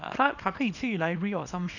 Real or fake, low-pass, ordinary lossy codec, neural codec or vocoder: fake; 7.2 kHz; none; codec, 24 kHz, 0.9 kbps, WavTokenizer, small release